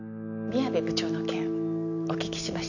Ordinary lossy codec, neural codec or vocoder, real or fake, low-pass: none; none; real; 7.2 kHz